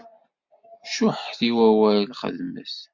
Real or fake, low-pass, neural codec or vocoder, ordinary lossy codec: real; 7.2 kHz; none; MP3, 64 kbps